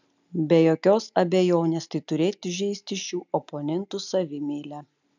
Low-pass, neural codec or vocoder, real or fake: 7.2 kHz; none; real